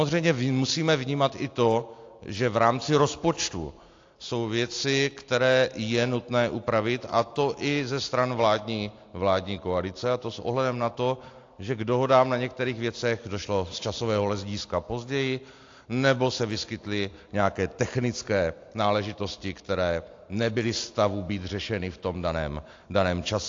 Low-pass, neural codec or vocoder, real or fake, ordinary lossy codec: 7.2 kHz; none; real; AAC, 48 kbps